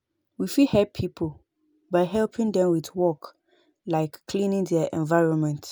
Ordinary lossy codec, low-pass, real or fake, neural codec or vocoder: none; none; real; none